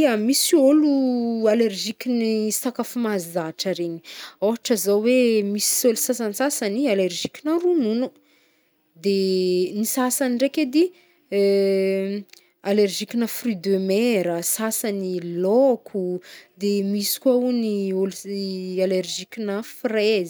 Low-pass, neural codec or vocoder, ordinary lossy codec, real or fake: none; none; none; real